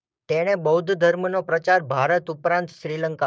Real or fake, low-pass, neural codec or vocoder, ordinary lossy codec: fake; none; codec, 16 kHz, 6 kbps, DAC; none